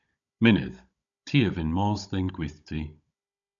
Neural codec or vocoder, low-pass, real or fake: codec, 16 kHz, 16 kbps, FunCodec, trained on Chinese and English, 50 frames a second; 7.2 kHz; fake